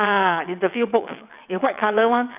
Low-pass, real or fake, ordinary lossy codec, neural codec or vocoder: 3.6 kHz; fake; none; vocoder, 22.05 kHz, 80 mel bands, WaveNeXt